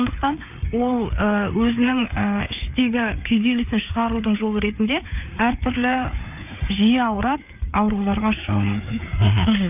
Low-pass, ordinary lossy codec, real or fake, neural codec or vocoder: 3.6 kHz; none; fake; codec, 16 kHz, 4 kbps, FreqCodec, larger model